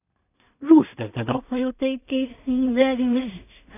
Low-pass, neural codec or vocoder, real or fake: 3.6 kHz; codec, 16 kHz in and 24 kHz out, 0.4 kbps, LongCat-Audio-Codec, two codebook decoder; fake